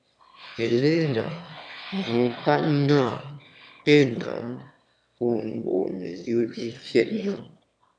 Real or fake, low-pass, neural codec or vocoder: fake; 9.9 kHz; autoencoder, 22.05 kHz, a latent of 192 numbers a frame, VITS, trained on one speaker